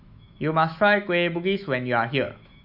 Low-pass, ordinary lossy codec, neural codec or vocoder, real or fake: 5.4 kHz; none; autoencoder, 48 kHz, 128 numbers a frame, DAC-VAE, trained on Japanese speech; fake